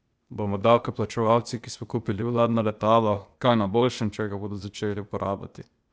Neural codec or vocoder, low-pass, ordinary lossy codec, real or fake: codec, 16 kHz, 0.8 kbps, ZipCodec; none; none; fake